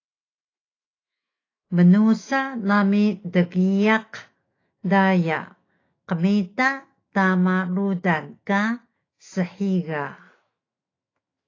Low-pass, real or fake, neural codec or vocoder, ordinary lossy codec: 7.2 kHz; fake; autoencoder, 48 kHz, 128 numbers a frame, DAC-VAE, trained on Japanese speech; AAC, 32 kbps